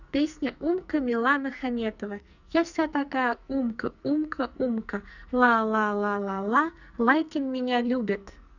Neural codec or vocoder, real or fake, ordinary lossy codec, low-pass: codec, 44.1 kHz, 2.6 kbps, SNAC; fake; none; 7.2 kHz